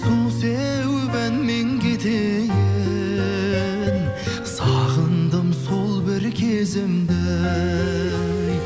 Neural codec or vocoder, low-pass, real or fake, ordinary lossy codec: none; none; real; none